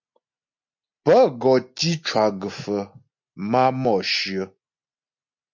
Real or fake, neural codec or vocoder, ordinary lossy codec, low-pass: real; none; MP3, 64 kbps; 7.2 kHz